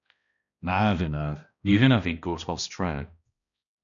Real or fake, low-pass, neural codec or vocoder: fake; 7.2 kHz; codec, 16 kHz, 1 kbps, X-Codec, HuBERT features, trained on balanced general audio